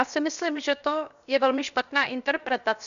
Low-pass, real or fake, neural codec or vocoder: 7.2 kHz; fake; codec, 16 kHz, 0.7 kbps, FocalCodec